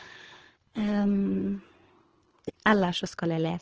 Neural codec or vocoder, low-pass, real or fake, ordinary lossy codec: codec, 24 kHz, 6 kbps, HILCodec; 7.2 kHz; fake; Opus, 16 kbps